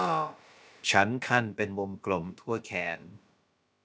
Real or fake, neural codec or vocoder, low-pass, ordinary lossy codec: fake; codec, 16 kHz, about 1 kbps, DyCAST, with the encoder's durations; none; none